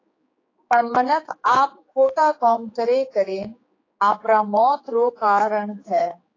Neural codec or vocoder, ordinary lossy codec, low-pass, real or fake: codec, 16 kHz, 4 kbps, X-Codec, HuBERT features, trained on general audio; AAC, 32 kbps; 7.2 kHz; fake